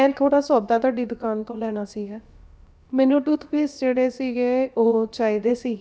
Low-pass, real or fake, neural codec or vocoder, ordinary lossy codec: none; fake; codec, 16 kHz, about 1 kbps, DyCAST, with the encoder's durations; none